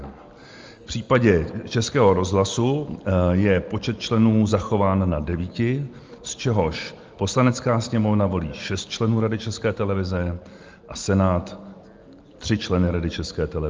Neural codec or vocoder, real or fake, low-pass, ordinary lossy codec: none; real; 7.2 kHz; Opus, 32 kbps